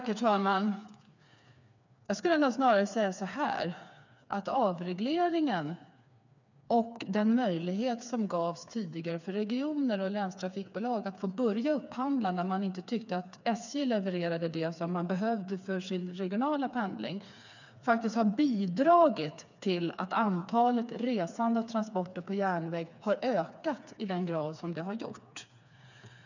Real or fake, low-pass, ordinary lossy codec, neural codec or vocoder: fake; 7.2 kHz; none; codec, 16 kHz, 4 kbps, FreqCodec, smaller model